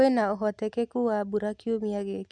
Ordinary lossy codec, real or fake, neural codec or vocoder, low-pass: MP3, 96 kbps; real; none; 9.9 kHz